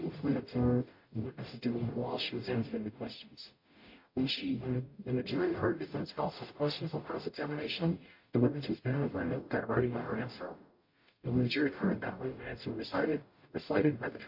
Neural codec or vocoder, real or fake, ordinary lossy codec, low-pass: codec, 44.1 kHz, 0.9 kbps, DAC; fake; MP3, 32 kbps; 5.4 kHz